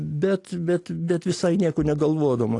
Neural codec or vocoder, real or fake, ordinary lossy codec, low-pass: none; real; AAC, 48 kbps; 10.8 kHz